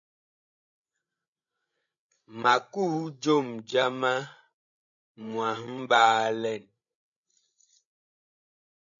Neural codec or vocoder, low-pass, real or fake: codec, 16 kHz, 16 kbps, FreqCodec, larger model; 7.2 kHz; fake